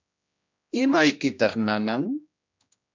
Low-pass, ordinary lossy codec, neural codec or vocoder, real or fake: 7.2 kHz; MP3, 64 kbps; codec, 16 kHz, 1 kbps, X-Codec, HuBERT features, trained on general audio; fake